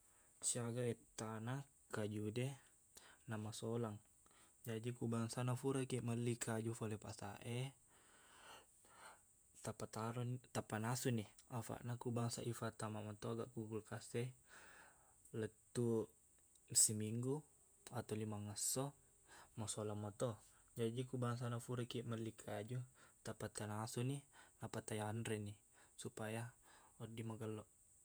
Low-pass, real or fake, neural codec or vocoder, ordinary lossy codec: none; fake; vocoder, 48 kHz, 128 mel bands, Vocos; none